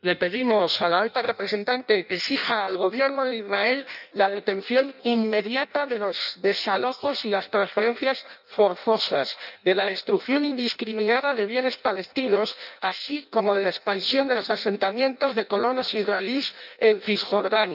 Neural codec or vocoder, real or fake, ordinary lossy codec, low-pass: codec, 16 kHz in and 24 kHz out, 0.6 kbps, FireRedTTS-2 codec; fake; none; 5.4 kHz